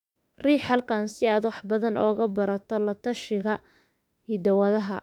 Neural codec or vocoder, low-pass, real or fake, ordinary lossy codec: autoencoder, 48 kHz, 32 numbers a frame, DAC-VAE, trained on Japanese speech; 19.8 kHz; fake; none